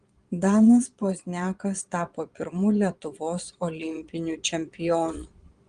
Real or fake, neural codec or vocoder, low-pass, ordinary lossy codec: fake; vocoder, 22.05 kHz, 80 mel bands, Vocos; 9.9 kHz; Opus, 24 kbps